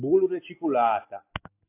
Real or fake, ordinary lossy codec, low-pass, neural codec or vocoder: real; AAC, 24 kbps; 3.6 kHz; none